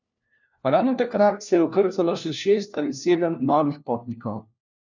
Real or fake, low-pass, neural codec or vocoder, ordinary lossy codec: fake; 7.2 kHz; codec, 16 kHz, 1 kbps, FunCodec, trained on LibriTTS, 50 frames a second; none